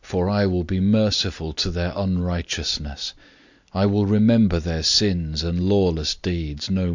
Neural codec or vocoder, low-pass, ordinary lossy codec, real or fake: none; 7.2 kHz; Opus, 64 kbps; real